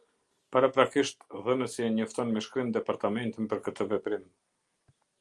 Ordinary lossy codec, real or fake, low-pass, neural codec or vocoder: Opus, 32 kbps; real; 10.8 kHz; none